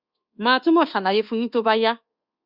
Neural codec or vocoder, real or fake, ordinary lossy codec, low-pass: codec, 24 kHz, 1.2 kbps, DualCodec; fake; Opus, 64 kbps; 5.4 kHz